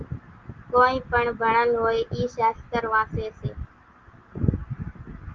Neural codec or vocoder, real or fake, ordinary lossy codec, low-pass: none; real; Opus, 32 kbps; 7.2 kHz